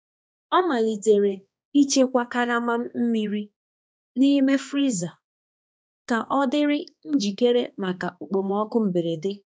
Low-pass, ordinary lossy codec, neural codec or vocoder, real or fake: none; none; codec, 16 kHz, 2 kbps, X-Codec, HuBERT features, trained on balanced general audio; fake